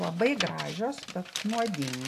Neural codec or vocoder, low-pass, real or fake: none; 14.4 kHz; real